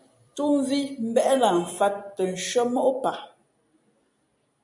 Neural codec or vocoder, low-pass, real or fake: none; 10.8 kHz; real